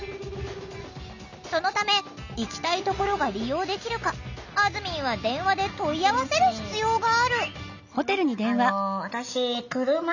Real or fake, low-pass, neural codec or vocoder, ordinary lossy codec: real; 7.2 kHz; none; none